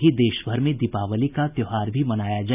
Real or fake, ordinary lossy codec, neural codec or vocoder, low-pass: real; none; none; 3.6 kHz